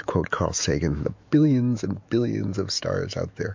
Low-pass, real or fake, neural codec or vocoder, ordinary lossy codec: 7.2 kHz; fake; autoencoder, 48 kHz, 128 numbers a frame, DAC-VAE, trained on Japanese speech; MP3, 48 kbps